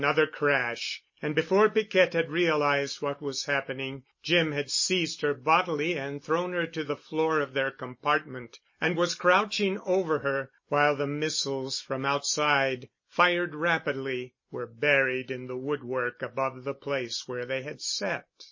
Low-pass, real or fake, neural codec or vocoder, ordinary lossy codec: 7.2 kHz; real; none; MP3, 32 kbps